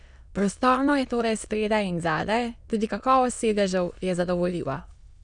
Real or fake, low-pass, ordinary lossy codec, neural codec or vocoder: fake; 9.9 kHz; none; autoencoder, 22.05 kHz, a latent of 192 numbers a frame, VITS, trained on many speakers